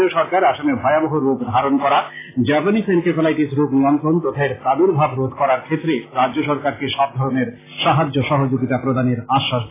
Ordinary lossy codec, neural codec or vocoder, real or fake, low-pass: AAC, 16 kbps; none; real; 3.6 kHz